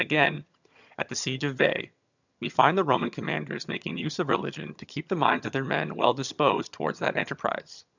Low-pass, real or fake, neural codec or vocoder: 7.2 kHz; fake; vocoder, 22.05 kHz, 80 mel bands, HiFi-GAN